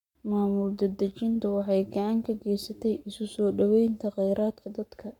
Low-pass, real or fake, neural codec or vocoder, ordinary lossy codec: 19.8 kHz; fake; codec, 44.1 kHz, 7.8 kbps, Pupu-Codec; none